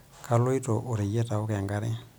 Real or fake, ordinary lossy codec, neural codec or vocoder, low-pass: real; none; none; none